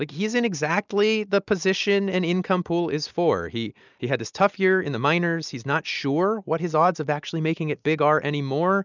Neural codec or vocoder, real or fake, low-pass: none; real; 7.2 kHz